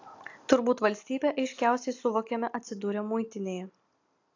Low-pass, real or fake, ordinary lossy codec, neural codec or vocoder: 7.2 kHz; real; AAC, 48 kbps; none